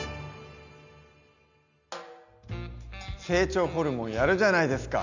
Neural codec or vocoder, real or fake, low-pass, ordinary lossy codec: none; real; 7.2 kHz; none